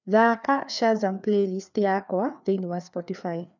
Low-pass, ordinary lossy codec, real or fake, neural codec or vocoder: 7.2 kHz; none; fake; codec, 16 kHz, 2 kbps, FreqCodec, larger model